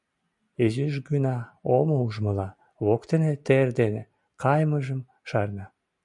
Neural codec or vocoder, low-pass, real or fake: none; 10.8 kHz; real